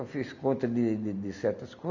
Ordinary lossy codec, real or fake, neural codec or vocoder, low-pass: none; real; none; 7.2 kHz